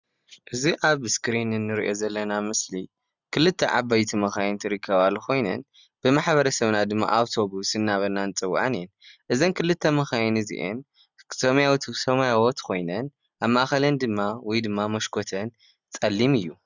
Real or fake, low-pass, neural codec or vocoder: real; 7.2 kHz; none